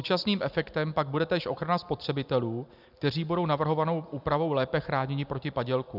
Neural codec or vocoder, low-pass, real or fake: none; 5.4 kHz; real